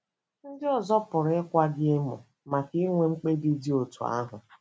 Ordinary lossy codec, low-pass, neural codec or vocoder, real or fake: none; none; none; real